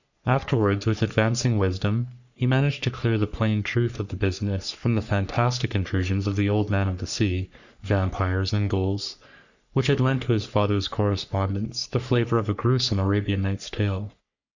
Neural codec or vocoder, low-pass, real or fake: codec, 44.1 kHz, 3.4 kbps, Pupu-Codec; 7.2 kHz; fake